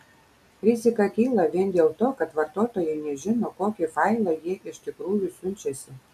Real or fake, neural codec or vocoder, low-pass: real; none; 14.4 kHz